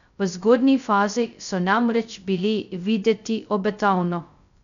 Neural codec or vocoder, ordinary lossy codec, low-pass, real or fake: codec, 16 kHz, 0.2 kbps, FocalCodec; none; 7.2 kHz; fake